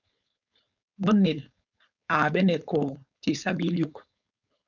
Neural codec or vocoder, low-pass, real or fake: codec, 16 kHz, 4.8 kbps, FACodec; 7.2 kHz; fake